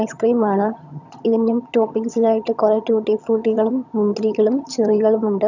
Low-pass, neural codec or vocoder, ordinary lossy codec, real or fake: 7.2 kHz; vocoder, 22.05 kHz, 80 mel bands, HiFi-GAN; none; fake